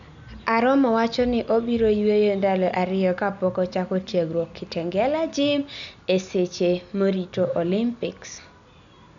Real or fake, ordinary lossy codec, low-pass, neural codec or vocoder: real; none; 7.2 kHz; none